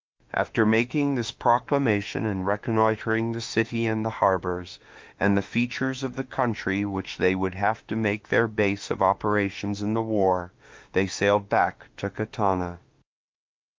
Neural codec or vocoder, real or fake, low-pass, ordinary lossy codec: autoencoder, 48 kHz, 32 numbers a frame, DAC-VAE, trained on Japanese speech; fake; 7.2 kHz; Opus, 24 kbps